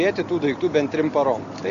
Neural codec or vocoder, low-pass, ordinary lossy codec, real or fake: none; 7.2 kHz; Opus, 64 kbps; real